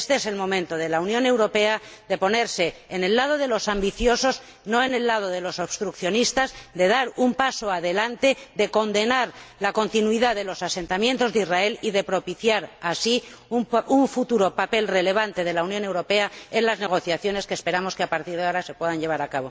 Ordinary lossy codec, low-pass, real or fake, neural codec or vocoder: none; none; real; none